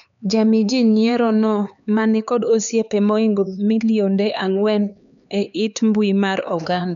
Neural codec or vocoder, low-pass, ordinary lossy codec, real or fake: codec, 16 kHz, 4 kbps, X-Codec, HuBERT features, trained on LibriSpeech; 7.2 kHz; none; fake